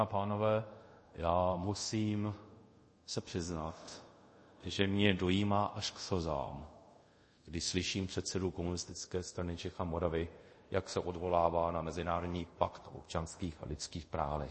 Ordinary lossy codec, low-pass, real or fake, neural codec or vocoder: MP3, 32 kbps; 10.8 kHz; fake; codec, 24 kHz, 0.5 kbps, DualCodec